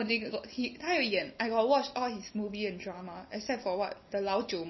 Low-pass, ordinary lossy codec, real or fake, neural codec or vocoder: 7.2 kHz; MP3, 24 kbps; real; none